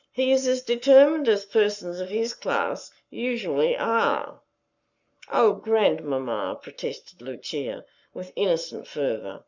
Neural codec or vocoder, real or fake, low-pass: codec, 44.1 kHz, 7.8 kbps, Pupu-Codec; fake; 7.2 kHz